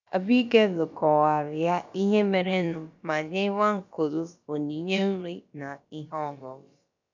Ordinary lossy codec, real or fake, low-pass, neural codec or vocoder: none; fake; 7.2 kHz; codec, 16 kHz, about 1 kbps, DyCAST, with the encoder's durations